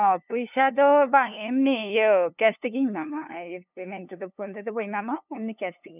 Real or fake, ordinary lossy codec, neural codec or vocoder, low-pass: fake; none; codec, 16 kHz, 2 kbps, FunCodec, trained on LibriTTS, 25 frames a second; 3.6 kHz